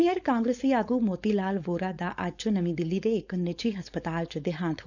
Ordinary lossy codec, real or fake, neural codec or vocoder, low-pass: none; fake; codec, 16 kHz, 4.8 kbps, FACodec; 7.2 kHz